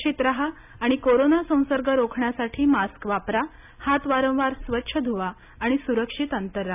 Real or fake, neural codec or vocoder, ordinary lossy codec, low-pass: real; none; none; 3.6 kHz